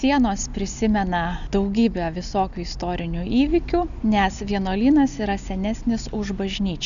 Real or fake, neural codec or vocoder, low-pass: real; none; 7.2 kHz